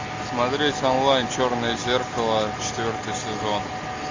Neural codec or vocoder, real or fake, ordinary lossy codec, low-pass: none; real; MP3, 48 kbps; 7.2 kHz